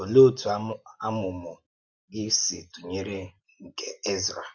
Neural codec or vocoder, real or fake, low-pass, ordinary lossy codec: none; real; 7.2 kHz; none